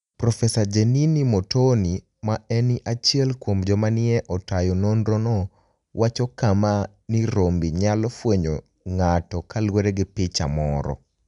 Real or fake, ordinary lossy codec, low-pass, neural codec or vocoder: real; none; 10.8 kHz; none